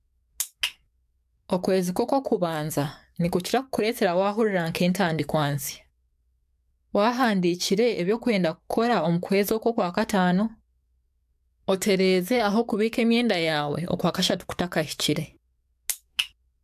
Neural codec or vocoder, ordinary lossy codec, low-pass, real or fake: codec, 44.1 kHz, 7.8 kbps, DAC; AAC, 96 kbps; 14.4 kHz; fake